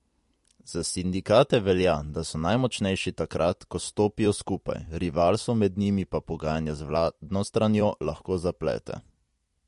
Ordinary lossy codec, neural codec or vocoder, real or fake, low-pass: MP3, 48 kbps; vocoder, 44.1 kHz, 128 mel bands every 256 samples, BigVGAN v2; fake; 14.4 kHz